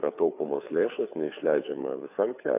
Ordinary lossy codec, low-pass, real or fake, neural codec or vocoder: AAC, 24 kbps; 3.6 kHz; fake; codec, 16 kHz, 4 kbps, FreqCodec, larger model